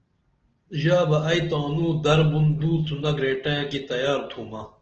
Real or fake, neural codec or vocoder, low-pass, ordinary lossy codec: real; none; 7.2 kHz; Opus, 16 kbps